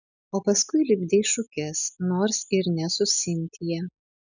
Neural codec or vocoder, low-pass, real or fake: none; 7.2 kHz; real